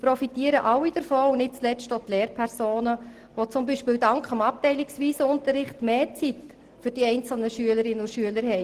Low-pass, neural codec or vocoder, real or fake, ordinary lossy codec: 14.4 kHz; none; real; Opus, 16 kbps